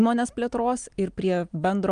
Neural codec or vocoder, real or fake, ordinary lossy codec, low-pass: none; real; Opus, 32 kbps; 9.9 kHz